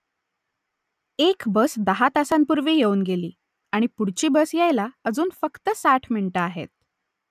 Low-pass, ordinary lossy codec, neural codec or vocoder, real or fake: 14.4 kHz; none; none; real